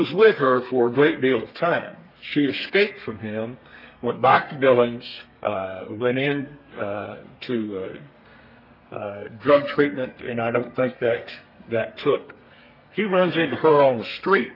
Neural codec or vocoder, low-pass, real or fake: codec, 32 kHz, 1.9 kbps, SNAC; 5.4 kHz; fake